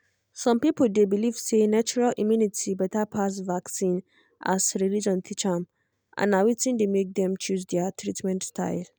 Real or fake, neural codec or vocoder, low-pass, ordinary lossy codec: real; none; none; none